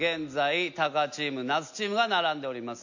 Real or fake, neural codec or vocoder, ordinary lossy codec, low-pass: real; none; none; 7.2 kHz